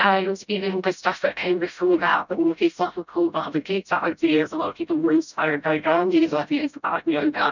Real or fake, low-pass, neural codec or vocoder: fake; 7.2 kHz; codec, 16 kHz, 0.5 kbps, FreqCodec, smaller model